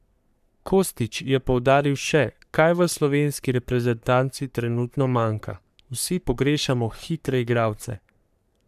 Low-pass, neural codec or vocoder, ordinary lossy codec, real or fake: 14.4 kHz; codec, 44.1 kHz, 3.4 kbps, Pupu-Codec; none; fake